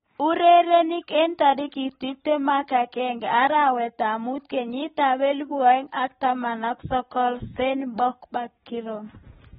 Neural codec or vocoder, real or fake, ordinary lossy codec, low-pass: none; real; AAC, 16 kbps; 19.8 kHz